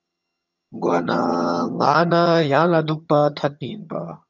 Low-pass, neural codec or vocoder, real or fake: 7.2 kHz; vocoder, 22.05 kHz, 80 mel bands, HiFi-GAN; fake